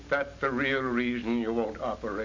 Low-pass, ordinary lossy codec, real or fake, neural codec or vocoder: 7.2 kHz; MP3, 48 kbps; real; none